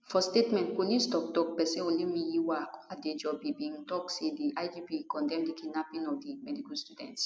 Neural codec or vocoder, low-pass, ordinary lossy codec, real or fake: none; none; none; real